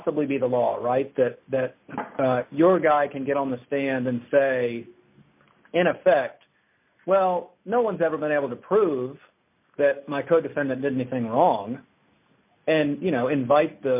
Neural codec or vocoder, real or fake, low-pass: none; real; 3.6 kHz